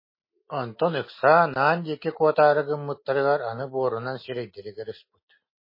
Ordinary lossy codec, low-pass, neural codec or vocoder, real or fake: MP3, 24 kbps; 5.4 kHz; none; real